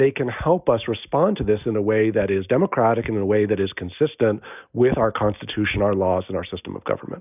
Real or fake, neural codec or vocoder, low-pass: real; none; 3.6 kHz